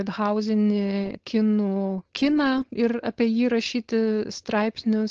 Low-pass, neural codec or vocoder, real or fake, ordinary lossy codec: 7.2 kHz; codec, 16 kHz, 4.8 kbps, FACodec; fake; Opus, 16 kbps